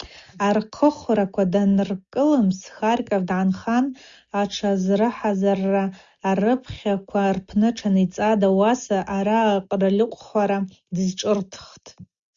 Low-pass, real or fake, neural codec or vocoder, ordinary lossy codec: 7.2 kHz; real; none; Opus, 64 kbps